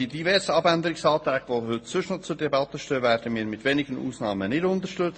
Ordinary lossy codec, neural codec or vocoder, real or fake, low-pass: MP3, 32 kbps; none; real; 9.9 kHz